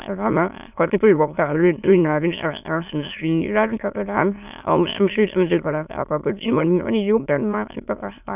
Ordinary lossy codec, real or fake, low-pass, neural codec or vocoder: none; fake; 3.6 kHz; autoencoder, 22.05 kHz, a latent of 192 numbers a frame, VITS, trained on many speakers